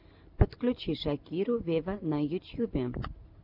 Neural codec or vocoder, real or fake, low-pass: none; real; 5.4 kHz